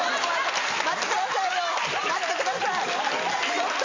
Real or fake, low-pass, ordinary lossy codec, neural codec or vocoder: real; 7.2 kHz; MP3, 48 kbps; none